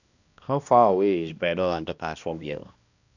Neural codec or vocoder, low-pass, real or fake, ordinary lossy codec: codec, 16 kHz, 1 kbps, X-Codec, HuBERT features, trained on balanced general audio; 7.2 kHz; fake; none